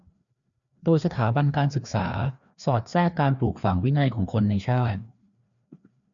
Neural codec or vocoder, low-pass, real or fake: codec, 16 kHz, 2 kbps, FreqCodec, larger model; 7.2 kHz; fake